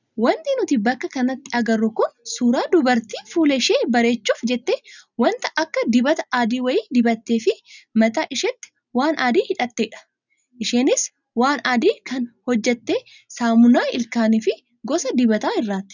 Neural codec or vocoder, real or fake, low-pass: none; real; 7.2 kHz